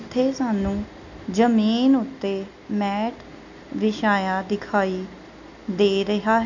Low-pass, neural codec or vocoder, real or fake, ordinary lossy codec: 7.2 kHz; none; real; none